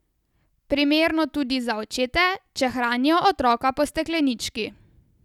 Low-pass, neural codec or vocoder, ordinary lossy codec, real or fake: 19.8 kHz; none; none; real